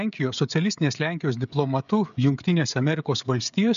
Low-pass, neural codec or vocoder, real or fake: 7.2 kHz; codec, 16 kHz, 16 kbps, FreqCodec, smaller model; fake